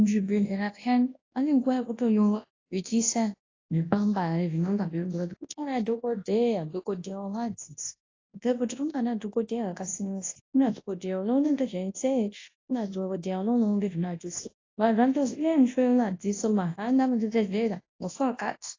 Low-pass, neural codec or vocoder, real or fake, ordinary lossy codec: 7.2 kHz; codec, 24 kHz, 0.9 kbps, WavTokenizer, large speech release; fake; AAC, 32 kbps